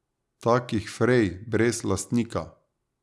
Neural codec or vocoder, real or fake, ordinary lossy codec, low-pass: none; real; none; none